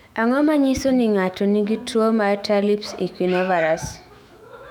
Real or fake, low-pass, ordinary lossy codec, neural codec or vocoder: fake; 19.8 kHz; none; autoencoder, 48 kHz, 128 numbers a frame, DAC-VAE, trained on Japanese speech